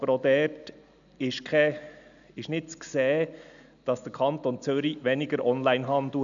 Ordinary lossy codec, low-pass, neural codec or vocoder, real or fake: none; 7.2 kHz; none; real